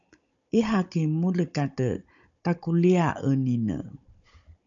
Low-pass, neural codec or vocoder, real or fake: 7.2 kHz; codec, 16 kHz, 16 kbps, FunCodec, trained on Chinese and English, 50 frames a second; fake